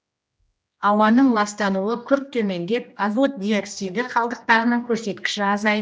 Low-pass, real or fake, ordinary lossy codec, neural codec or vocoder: none; fake; none; codec, 16 kHz, 1 kbps, X-Codec, HuBERT features, trained on general audio